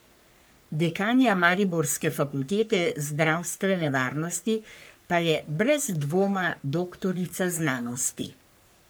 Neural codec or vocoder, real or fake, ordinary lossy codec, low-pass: codec, 44.1 kHz, 3.4 kbps, Pupu-Codec; fake; none; none